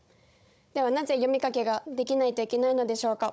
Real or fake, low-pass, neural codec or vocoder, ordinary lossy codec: fake; none; codec, 16 kHz, 16 kbps, FunCodec, trained on Chinese and English, 50 frames a second; none